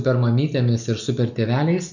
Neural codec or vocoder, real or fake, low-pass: none; real; 7.2 kHz